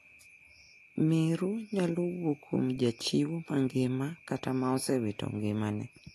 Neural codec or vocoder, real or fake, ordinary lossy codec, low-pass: none; real; AAC, 48 kbps; 14.4 kHz